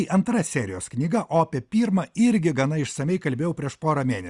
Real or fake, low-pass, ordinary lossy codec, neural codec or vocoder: real; 10.8 kHz; Opus, 64 kbps; none